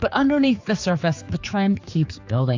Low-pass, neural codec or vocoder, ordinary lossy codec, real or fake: 7.2 kHz; codec, 16 kHz, 4 kbps, X-Codec, HuBERT features, trained on general audio; AAC, 48 kbps; fake